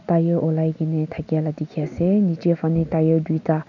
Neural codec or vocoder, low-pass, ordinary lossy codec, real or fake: none; 7.2 kHz; none; real